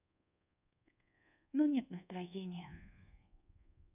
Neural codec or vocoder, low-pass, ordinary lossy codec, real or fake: codec, 24 kHz, 1.2 kbps, DualCodec; 3.6 kHz; none; fake